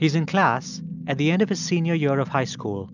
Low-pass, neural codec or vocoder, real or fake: 7.2 kHz; none; real